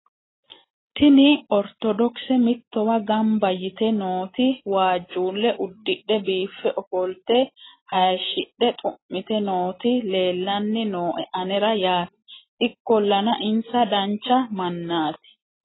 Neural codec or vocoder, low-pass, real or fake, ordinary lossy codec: none; 7.2 kHz; real; AAC, 16 kbps